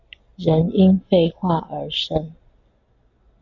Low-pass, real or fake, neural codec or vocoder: 7.2 kHz; real; none